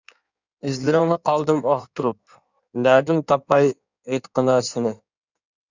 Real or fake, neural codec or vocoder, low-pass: fake; codec, 16 kHz in and 24 kHz out, 1.1 kbps, FireRedTTS-2 codec; 7.2 kHz